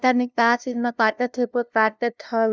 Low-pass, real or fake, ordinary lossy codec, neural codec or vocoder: none; fake; none; codec, 16 kHz, 0.5 kbps, FunCodec, trained on LibriTTS, 25 frames a second